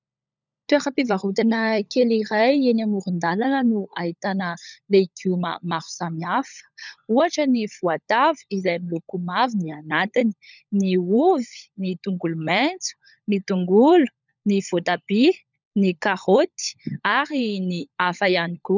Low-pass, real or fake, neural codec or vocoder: 7.2 kHz; fake; codec, 16 kHz, 16 kbps, FunCodec, trained on LibriTTS, 50 frames a second